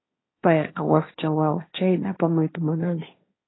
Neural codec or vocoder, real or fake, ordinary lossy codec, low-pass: codec, 16 kHz, 1.1 kbps, Voila-Tokenizer; fake; AAC, 16 kbps; 7.2 kHz